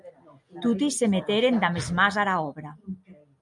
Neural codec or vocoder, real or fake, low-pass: none; real; 10.8 kHz